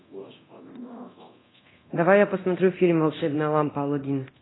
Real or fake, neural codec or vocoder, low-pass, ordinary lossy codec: fake; codec, 24 kHz, 0.9 kbps, DualCodec; 7.2 kHz; AAC, 16 kbps